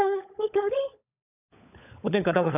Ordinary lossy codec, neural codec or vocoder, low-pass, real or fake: none; codec, 16 kHz, 16 kbps, FunCodec, trained on LibriTTS, 50 frames a second; 3.6 kHz; fake